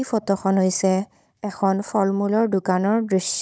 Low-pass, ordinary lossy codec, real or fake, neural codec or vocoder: none; none; fake; codec, 16 kHz, 16 kbps, FunCodec, trained on Chinese and English, 50 frames a second